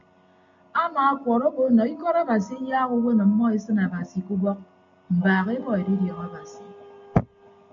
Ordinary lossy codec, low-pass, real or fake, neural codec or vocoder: MP3, 64 kbps; 7.2 kHz; real; none